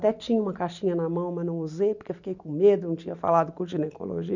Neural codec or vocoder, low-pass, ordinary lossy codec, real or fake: none; 7.2 kHz; none; real